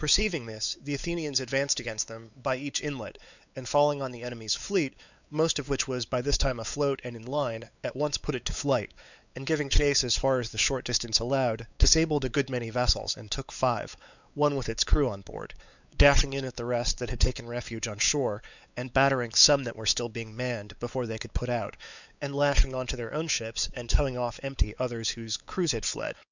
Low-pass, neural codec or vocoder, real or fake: 7.2 kHz; codec, 16 kHz, 4 kbps, X-Codec, WavLM features, trained on Multilingual LibriSpeech; fake